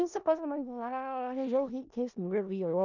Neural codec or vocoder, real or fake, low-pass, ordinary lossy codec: codec, 16 kHz in and 24 kHz out, 0.4 kbps, LongCat-Audio-Codec, four codebook decoder; fake; 7.2 kHz; none